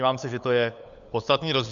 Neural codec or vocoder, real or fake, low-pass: codec, 16 kHz, 8 kbps, FunCodec, trained on LibriTTS, 25 frames a second; fake; 7.2 kHz